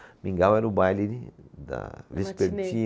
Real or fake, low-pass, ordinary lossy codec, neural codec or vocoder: real; none; none; none